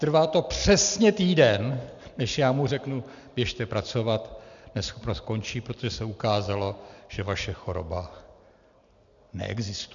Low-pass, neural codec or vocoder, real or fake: 7.2 kHz; none; real